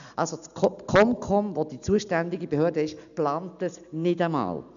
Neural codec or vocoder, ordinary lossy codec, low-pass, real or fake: none; none; 7.2 kHz; real